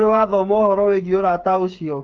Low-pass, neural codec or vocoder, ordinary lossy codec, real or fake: 7.2 kHz; codec, 16 kHz, 8 kbps, FreqCodec, smaller model; Opus, 32 kbps; fake